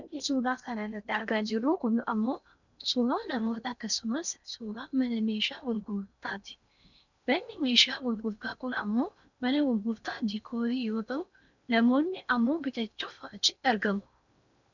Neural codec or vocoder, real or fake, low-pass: codec, 16 kHz in and 24 kHz out, 0.8 kbps, FocalCodec, streaming, 65536 codes; fake; 7.2 kHz